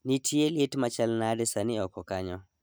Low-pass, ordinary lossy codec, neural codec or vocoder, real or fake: none; none; none; real